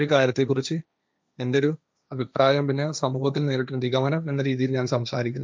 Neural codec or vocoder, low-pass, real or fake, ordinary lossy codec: codec, 16 kHz, 1.1 kbps, Voila-Tokenizer; none; fake; none